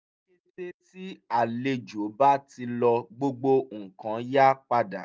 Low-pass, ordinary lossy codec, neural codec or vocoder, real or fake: none; none; none; real